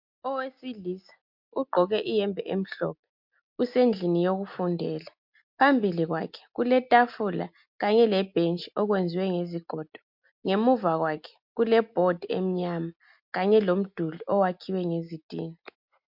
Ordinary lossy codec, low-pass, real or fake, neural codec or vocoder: MP3, 48 kbps; 5.4 kHz; real; none